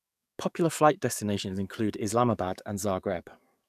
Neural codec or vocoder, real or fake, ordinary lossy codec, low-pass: codec, 44.1 kHz, 7.8 kbps, DAC; fake; none; 14.4 kHz